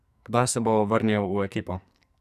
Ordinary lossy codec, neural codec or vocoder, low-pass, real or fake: none; codec, 44.1 kHz, 2.6 kbps, SNAC; 14.4 kHz; fake